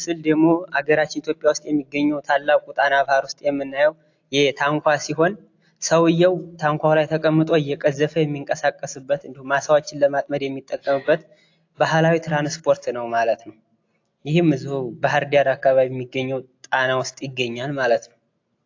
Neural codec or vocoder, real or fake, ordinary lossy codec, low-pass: none; real; AAC, 48 kbps; 7.2 kHz